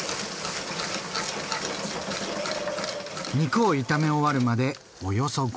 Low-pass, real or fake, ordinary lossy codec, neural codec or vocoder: none; real; none; none